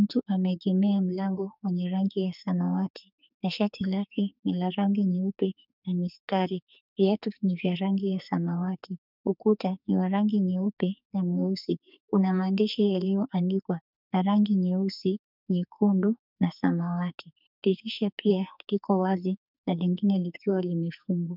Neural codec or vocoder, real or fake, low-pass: codec, 44.1 kHz, 2.6 kbps, SNAC; fake; 5.4 kHz